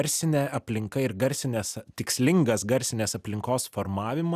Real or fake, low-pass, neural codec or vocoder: real; 14.4 kHz; none